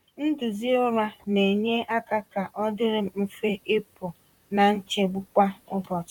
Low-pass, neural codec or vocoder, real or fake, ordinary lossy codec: 19.8 kHz; vocoder, 44.1 kHz, 128 mel bands, Pupu-Vocoder; fake; none